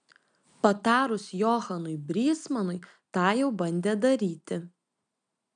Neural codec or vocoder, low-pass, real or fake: none; 9.9 kHz; real